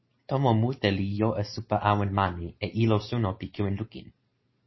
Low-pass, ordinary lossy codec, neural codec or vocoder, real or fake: 7.2 kHz; MP3, 24 kbps; none; real